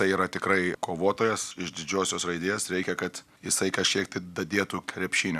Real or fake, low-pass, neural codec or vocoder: real; 14.4 kHz; none